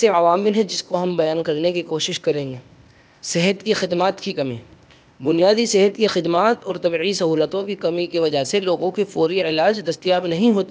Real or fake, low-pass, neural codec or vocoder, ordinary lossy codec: fake; none; codec, 16 kHz, 0.8 kbps, ZipCodec; none